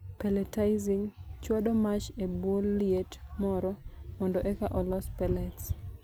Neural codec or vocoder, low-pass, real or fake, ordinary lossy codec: none; none; real; none